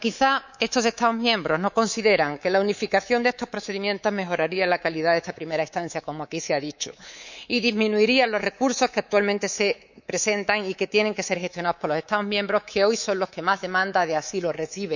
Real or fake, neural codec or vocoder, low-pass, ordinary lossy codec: fake; codec, 24 kHz, 3.1 kbps, DualCodec; 7.2 kHz; none